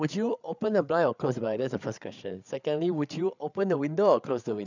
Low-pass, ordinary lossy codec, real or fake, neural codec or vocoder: 7.2 kHz; none; fake; codec, 16 kHz, 8 kbps, FreqCodec, larger model